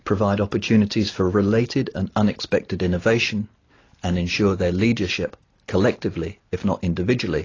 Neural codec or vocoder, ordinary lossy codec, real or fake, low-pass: none; AAC, 32 kbps; real; 7.2 kHz